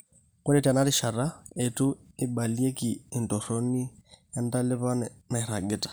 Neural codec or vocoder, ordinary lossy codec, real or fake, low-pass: none; none; real; none